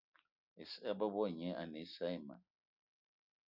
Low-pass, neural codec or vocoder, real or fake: 5.4 kHz; none; real